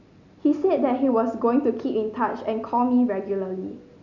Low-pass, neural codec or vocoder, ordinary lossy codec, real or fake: 7.2 kHz; none; none; real